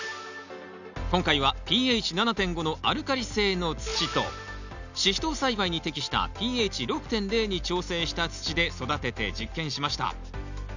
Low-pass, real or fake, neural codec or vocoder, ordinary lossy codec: 7.2 kHz; real; none; none